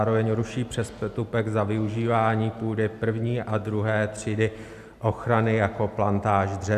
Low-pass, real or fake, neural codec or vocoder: 14.4 kHz; real; none